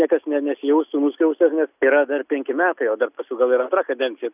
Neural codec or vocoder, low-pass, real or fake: none; 3.6 kHz; real